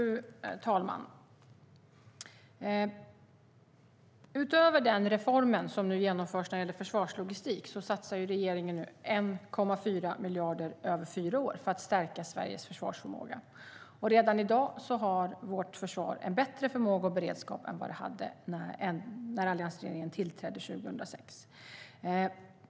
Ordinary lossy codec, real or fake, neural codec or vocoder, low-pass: none; real; none; none